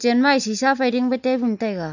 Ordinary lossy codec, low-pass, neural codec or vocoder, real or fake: none; 7.2 kHz; none; real